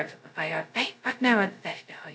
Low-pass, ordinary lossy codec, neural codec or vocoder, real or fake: none; none; codec, 16 kHz, 0.2 kbps, FocalCodec; fake